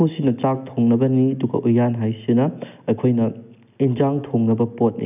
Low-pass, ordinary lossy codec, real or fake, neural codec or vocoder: 3.6 kHz; none; real; none